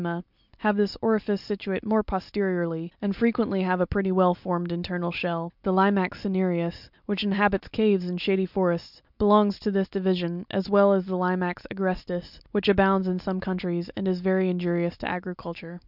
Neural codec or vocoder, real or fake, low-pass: none; real; 5.4 kHz